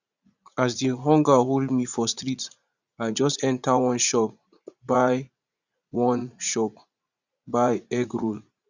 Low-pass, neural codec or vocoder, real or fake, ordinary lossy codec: 7.2 kHz; vocoder, 44.1 kHz, 80 mel bands, Vocos; fake; Opus, 64 kbps